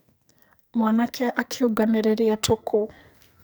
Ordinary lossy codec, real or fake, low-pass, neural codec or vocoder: none; fake; none; codec, 44.1 kHz, 2.6 kbps, SNAC